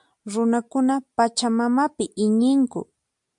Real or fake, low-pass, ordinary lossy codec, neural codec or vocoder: real; 10.8 kHz; MP3, 64 kbps; none